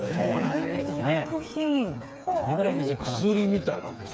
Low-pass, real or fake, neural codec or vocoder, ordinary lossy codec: none; fake; codec, 16 kHz, 4 kbps, FreqCodec, smaller model; none